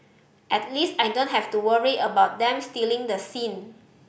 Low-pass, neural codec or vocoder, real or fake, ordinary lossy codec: none; none; real; none